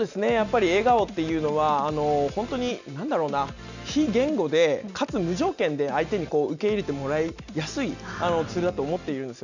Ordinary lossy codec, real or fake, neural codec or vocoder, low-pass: none; real; none; 7.2 kHz